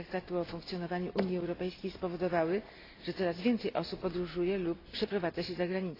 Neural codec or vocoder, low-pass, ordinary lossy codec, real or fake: none; 5.4 kHz; AAC, 24 kbps; real